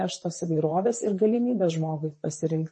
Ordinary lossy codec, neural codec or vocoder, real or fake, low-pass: MP3, 32 kbps; vocoder, 44.1 kHz, 128 mel bands, Pupu-Vocoder; fake; 10.8 kHz